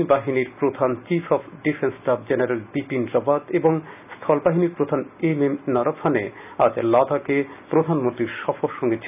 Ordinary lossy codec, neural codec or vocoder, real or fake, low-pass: none; none; real; 3.6 kHz